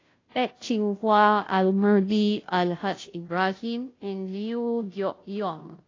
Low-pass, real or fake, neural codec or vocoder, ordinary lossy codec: 7.2 kHz; fake; codec, 16 kHz, 0.5 kbps, FunCodec, trained on Chinese and English, 25 frames a second; AAC, 32 kbps